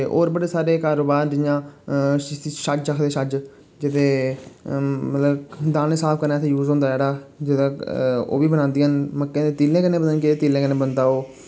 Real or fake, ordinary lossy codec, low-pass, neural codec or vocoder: real; none; none; none